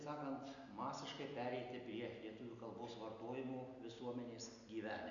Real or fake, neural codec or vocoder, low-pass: real; none; 7.2 kHz